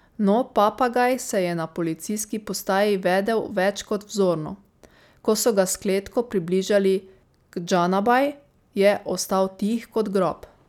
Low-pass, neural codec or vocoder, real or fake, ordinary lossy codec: 19.8 kHz; none; real; none